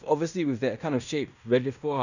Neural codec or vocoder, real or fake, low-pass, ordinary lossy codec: codec, 16 kHz in and 24 kHz out, 0.9 kbps, LongCat-Audio-Codec, fine tuned four codebook decoder; fake; 7.2 kHz; none